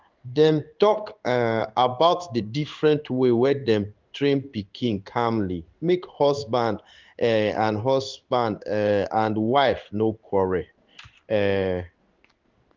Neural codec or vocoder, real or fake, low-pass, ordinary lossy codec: codec, 16 kHz in and 24 kHz out, 1 kbps, XY-Tokenizer; fake; 7.2 kHz; Opus, 32 kbps